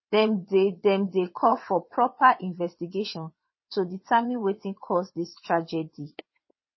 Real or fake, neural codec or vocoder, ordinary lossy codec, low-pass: fake; vocoder, 22.05 kHz, 80 mel bands, WaveNeXt; MP3, 24 kbps; 7.2 kHz